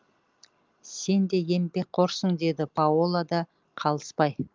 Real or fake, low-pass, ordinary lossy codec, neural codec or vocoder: real; 7.2 kHz; Opus, 32 kbps; none